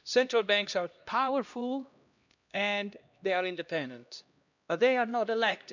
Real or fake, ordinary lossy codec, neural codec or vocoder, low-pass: fake; none; codec, 16 kHz, 1 kbps, X-Codec, HuBERT features, trained on LibriSpeech; 7.2 kHz